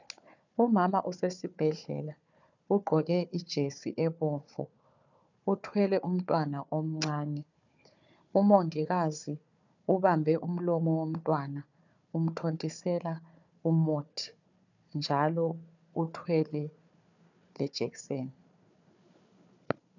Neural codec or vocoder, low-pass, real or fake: codec, 16 kHz, 4 kbps, FunCodec, trained on Chinese and English, 50 frames a second; 7.2 kHz; fake